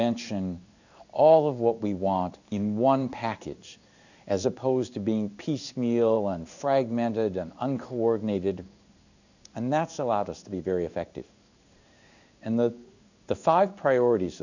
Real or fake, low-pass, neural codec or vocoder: fake; 7.2 kHz; codec, 16 kHz in and 24 kHz out, 1 kbps, XY-Tokenizer